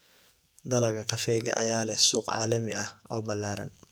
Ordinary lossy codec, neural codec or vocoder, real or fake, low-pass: none; codec, 44.1 kHz, 2.6 kbps, SNAC; fake; none